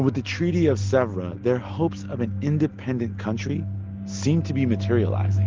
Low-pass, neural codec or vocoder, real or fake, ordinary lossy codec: 7.2 kHz; none; real; Opus, 16 kbps